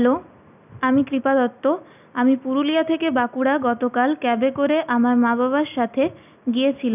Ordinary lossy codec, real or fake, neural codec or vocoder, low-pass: none; real; none; 3.6 kHz